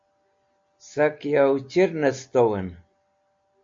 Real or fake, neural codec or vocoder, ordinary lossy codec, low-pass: real; none; MP3, 48 kbps; 7.2 kHz